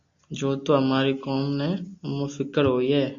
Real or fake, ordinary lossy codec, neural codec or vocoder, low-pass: real; MP3, 48 kbps; none; 7.2 kHz